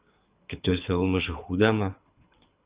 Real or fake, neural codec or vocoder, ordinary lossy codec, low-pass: fake; codec, 24 kHz, 6 kbps, HILCodec; Opus, 64 kbps; 3.6 kHz